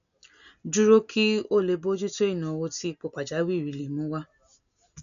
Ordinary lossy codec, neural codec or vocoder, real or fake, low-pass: none; none; real; 7.2 kHz